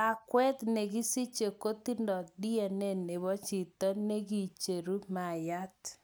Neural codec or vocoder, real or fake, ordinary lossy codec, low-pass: none; real; none; none